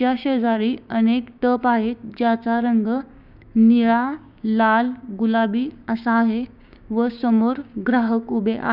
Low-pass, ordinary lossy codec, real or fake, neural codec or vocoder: 5.4 kHz; none; fake; codec, 16 kHz, 6 kbps, DAC